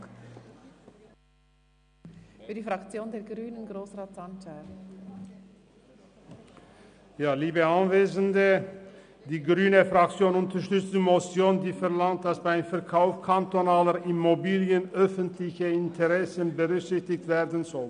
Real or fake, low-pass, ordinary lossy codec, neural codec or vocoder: real; 9.9 kHz; none; none